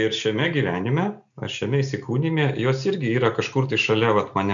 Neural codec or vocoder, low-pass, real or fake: none; 7.2 kHz; real